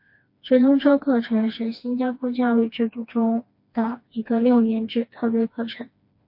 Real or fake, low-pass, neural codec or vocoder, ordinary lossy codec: fake; 5.4 kHz; codec, 16 kHz, 2 kbps, FreqCodec, smaller model; MP3, 32 kbps